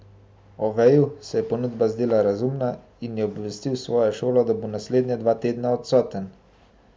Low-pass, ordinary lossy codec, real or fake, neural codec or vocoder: none; none; real; none